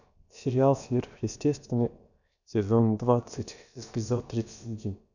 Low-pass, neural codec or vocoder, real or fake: 7.2 kHz; codec, 16 kHz, about 1 kbps, DyCAST, with the encoder's durations; fake